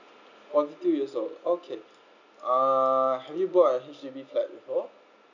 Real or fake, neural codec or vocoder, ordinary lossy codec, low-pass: real; none; none; 7.2 kHz